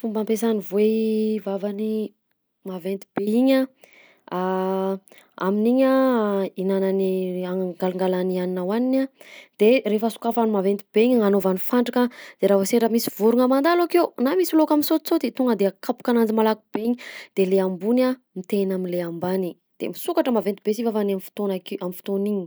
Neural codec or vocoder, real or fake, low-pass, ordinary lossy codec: none; real; none; none